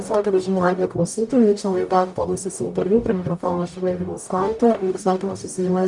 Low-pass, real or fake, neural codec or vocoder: 10.8 kHz; fake; codec, 44.1 kHz, 0.9 kbps, DAC